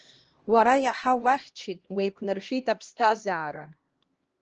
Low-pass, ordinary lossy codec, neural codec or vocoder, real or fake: 7.2 kHz; Opus, 16 kbps; codec, 16 kHz, 1 kbps, X-Codec, HuBERT features, trained on LibriSpeech; fake